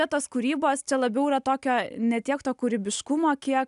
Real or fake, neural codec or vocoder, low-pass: real; none; 10.8 kHz